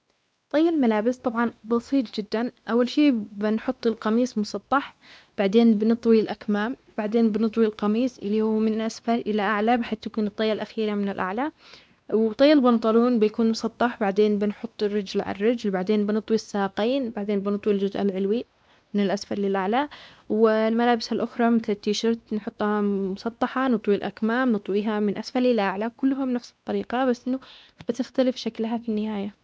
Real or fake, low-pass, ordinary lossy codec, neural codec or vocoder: fake; none; none; codec, 16 kHz, 2 kbps, X-Codec, WavLM features, trained on Multilingual LibriSpeech